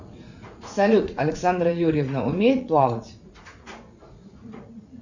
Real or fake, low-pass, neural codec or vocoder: fake; 7.2 kHz; vocoder, 44.1 kHz, 80 mel bands, Vocos